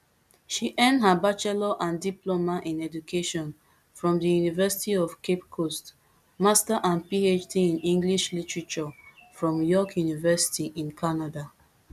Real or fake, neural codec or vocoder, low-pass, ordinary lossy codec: real; none; 14.4 kHz; none